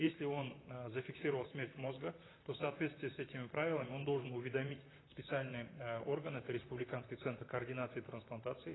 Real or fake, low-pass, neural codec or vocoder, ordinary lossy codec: fake; 7.2 kHz; vocoder, 44.1 kHz, 128 mel bands, Pupu-Vocoder; AAC, 16 kbps